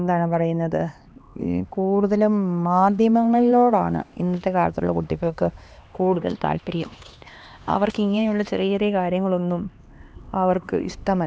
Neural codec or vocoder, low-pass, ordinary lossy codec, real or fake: codec, 16 kHz, 2 kbps, X-Codec, HuBERT features, trained on LibriSpeech; none; none; fake